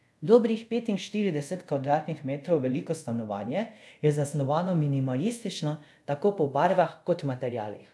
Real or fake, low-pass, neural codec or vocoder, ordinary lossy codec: fake; none; codec, 24 kHz, 0.5 kbps, DualCodec; none